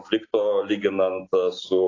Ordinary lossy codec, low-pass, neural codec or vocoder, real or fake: AAC, 32 kbps; 7.2 kHz; none; real